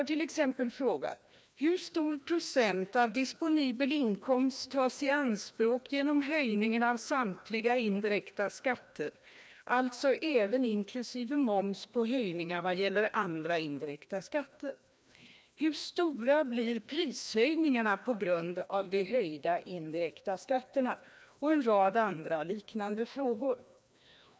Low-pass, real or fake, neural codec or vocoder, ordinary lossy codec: none; fake; codec, 16 kHz, 1 kbps, FreqCodec, larger model; none